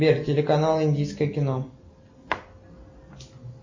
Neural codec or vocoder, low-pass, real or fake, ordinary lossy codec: none; 7.2 kHz; real; MP3, 32 kbps